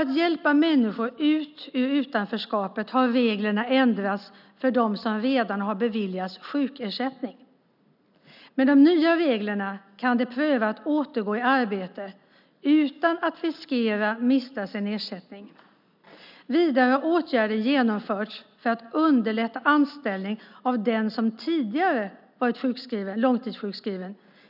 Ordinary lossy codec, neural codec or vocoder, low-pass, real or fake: none; none; 5.4 kHz; real